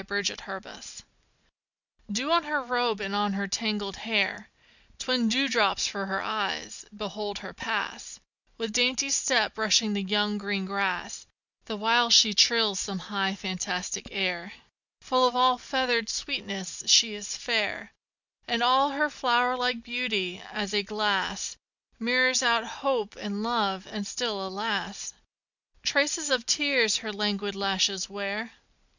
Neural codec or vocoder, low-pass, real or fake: none; 7.2 kHz; real